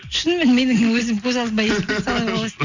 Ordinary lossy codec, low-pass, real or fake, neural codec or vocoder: none; 7.2 kHz; real; none